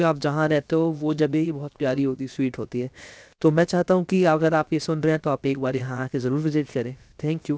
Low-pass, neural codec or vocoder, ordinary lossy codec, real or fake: none; codec, 16 kHz, 0.7 kbps, FocalCodec; none; fake